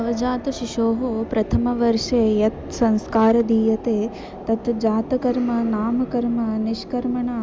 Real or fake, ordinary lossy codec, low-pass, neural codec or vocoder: real; none; none; none